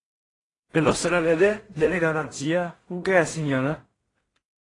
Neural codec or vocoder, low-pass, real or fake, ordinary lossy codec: codec, 16 kHz in and 24 kHz out, 0.4 kbps, LongCat-Audio-Codec, two codebook decoder; 10.8 kHz; fake; AAC, 32 kbps